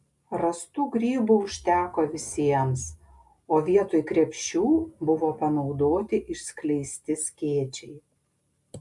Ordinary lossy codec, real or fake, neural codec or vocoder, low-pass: MP3, 64 kbps; real; none; 10.8 kHz